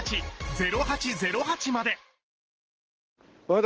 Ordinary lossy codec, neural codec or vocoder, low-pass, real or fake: Opus, 16 kbps; none; 7.2 kHz; real